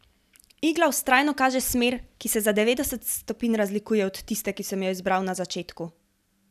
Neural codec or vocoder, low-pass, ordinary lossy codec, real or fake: none; 14.4 kHz; none; real